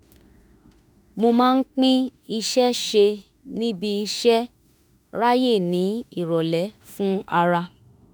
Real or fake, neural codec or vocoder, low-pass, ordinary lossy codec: fake; autoencoder, 48 kHz, 32 numbers a frame, DAC-VAE, trained on Japanese speech; none; none